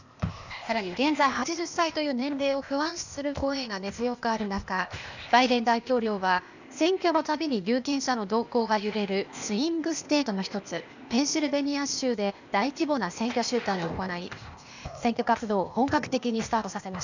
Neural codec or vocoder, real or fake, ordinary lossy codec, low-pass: codec, 16 kHz, 0.8 kbps, ZipCodec; fake; none; 7.2 kHz